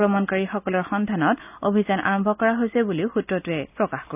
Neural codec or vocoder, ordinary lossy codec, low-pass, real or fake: none; none; 3.6 kHz; real